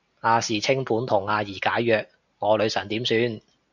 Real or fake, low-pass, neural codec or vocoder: real; 7.2 kHz; none